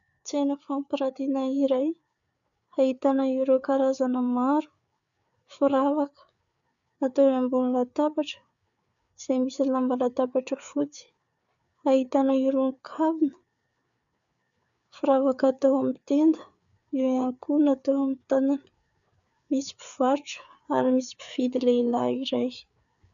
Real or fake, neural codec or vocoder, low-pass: fake; codec, 16 kHz, 4 kbps, FreqCodec, larger model; 7.2 kHz